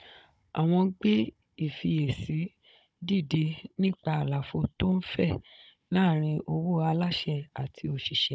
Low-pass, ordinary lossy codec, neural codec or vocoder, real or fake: none; none; codec, 16 kHz, 16 kbps, FunCodec, trained on Chinese and English, 50 frames a second; fake